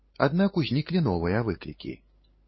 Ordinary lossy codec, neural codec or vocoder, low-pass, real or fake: MP3, 24 kbps; codec, 16 kHz, 8 kbps, FunCodec, trained on LibriTTS, 25 frames a second; 7.2 kHz; fake